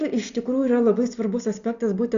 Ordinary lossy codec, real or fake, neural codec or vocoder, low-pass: Opus, 64 kbps; real; none; 7.2 kHz